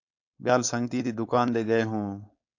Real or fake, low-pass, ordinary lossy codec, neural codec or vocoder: fake; 7.2 kHz; AAC, 48 kbps; codec, 16 kHz, 4.8 kbps, FACodec